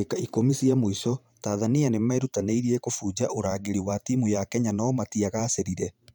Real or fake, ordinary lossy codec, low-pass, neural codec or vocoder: fake; none; none; vocoder, 44.1 kHz, 128 mel bands every 512 samples, BigVGAN v2